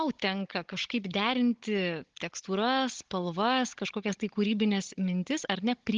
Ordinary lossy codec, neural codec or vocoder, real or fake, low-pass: Opus, 16 kbps; codec, 16 kHz, 16 kbps, FunCodec, trained on Chinese and English, 50 frames a second; fake; 7.2 kHz